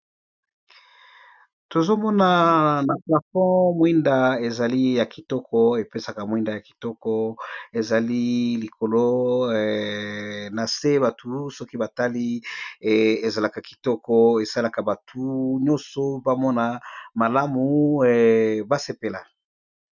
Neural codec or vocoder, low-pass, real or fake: none; 7.2 kHz; real